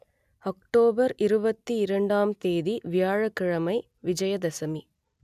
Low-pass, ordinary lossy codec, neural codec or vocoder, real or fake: 14.4 kHz; none; none; real